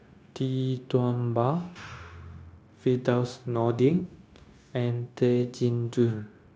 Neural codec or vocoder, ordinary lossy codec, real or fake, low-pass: codec, 16 kHz, 0.9 kbps, LongCat-Audio-Codec; none; fake; none